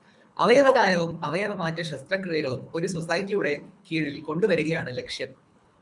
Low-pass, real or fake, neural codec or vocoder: 10.8 kHz; fake; codec, 24 kHz, 3 kbps, HILCodec